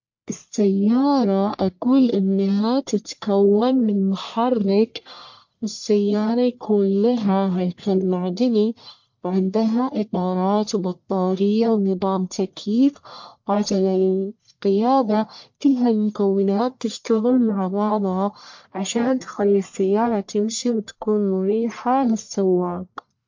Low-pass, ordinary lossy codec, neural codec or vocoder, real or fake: 7.2 kHz; MP3, 48 kbps; codec, 44.1 kHz, 1.7 kbps, Pupu-Codec; fake